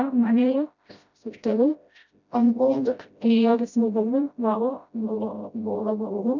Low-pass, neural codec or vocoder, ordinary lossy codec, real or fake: 7.2 kHz; codec, 16 kHz, 0.5 kbps, FreqCodec, smaller model; none; fake